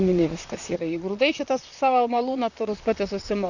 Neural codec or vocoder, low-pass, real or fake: vocoder, 44.1 kHz, 128 mel bands, Pupu-Vocoder; 7.2 kHz; fake